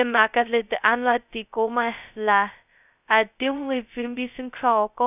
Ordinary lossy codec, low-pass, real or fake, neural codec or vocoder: none; 3.6 kHz; fake; codec, 16 kHz, 0.2 kbps, FocalCodec